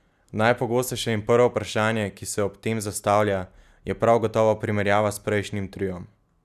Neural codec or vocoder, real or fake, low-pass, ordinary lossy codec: none; real; 14.4 kHz; none